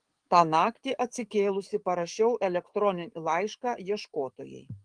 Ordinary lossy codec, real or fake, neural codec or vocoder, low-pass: Opus, 24 kbps; fake; codec, 44.1 kHz, 7.8 kbps, DAC; 9.9 kHz